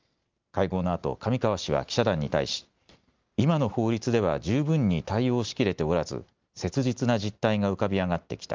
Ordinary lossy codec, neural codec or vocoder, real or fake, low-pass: Opus, 24 kbps; none; real; 7.2 kHz